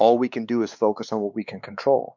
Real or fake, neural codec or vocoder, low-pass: fake; codec, 16 kHz, 4 kbps, X-Codec, WavLM features, trained on Multilingual LibriSpeech; 7.2 kHz